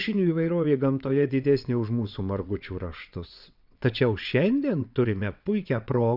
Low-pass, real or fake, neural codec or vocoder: 5.4 kHz; fake; vocoder, 22.05 kHz, 80 mel bands, WaveNeXt